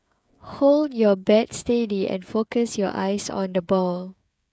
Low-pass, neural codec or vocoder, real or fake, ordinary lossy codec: none; codec, 16 kHz, 16 kbps, FreqCodec, smaller model; fake; none